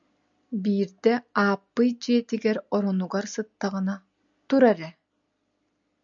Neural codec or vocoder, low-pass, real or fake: none; 7.2 kHz; real